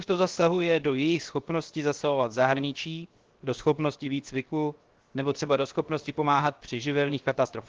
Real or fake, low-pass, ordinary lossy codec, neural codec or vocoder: fake; 7.2 kHz; Opus, 16 kbps; codec, 16 kHz, 0.7 kbps, FocalCodec